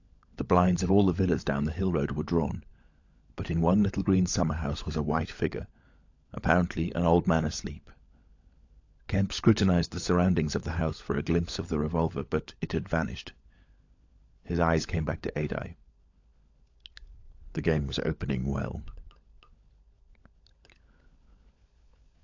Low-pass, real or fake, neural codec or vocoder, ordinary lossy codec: 7.2 kHz; fake; codec, 16 kHz, 16 kbps, FunCodec, trained on LibriTTS, 50 frames a second; AAC, 48 kbps